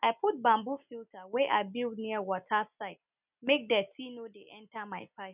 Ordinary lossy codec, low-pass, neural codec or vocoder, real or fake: none; 3.6 kHz; none; real